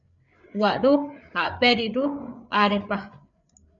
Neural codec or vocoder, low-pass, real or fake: codec, 16 kHz, 8 kbps, FreqCodec, larger model; 7.2 kHz; fake